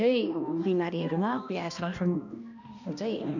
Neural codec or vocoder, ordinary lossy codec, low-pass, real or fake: codec, 16 kHz, 1 kbps, X-Codec, HuBERT features, trained on balanced general audio; none; 7.2 kHz; fake